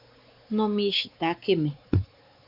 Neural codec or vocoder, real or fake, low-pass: codec, 16 kHz, 4 kbps, X-Codec, WavLM features, trained on Multilingual LibriSpeech; fake; 5.4 kHz